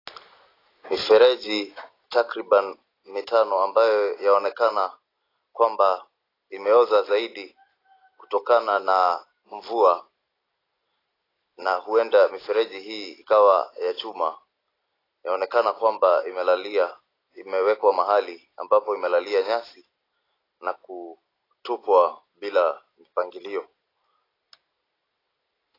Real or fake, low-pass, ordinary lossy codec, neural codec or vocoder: real; 5.4 kHz; AAC, 24 kbps; none